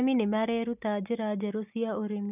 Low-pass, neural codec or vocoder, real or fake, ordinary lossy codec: 3.6 kHz; none; real; none